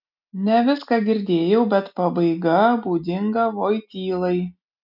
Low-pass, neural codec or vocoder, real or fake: 5.4 kHz; none; real